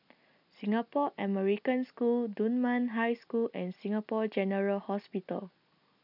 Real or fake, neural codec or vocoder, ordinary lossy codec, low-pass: real; none; none; 5.4 kHz